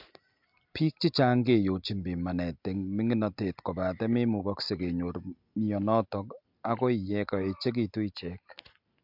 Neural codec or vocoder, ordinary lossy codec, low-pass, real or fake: none; MP3, 48 kbps; 5.4 kHz; real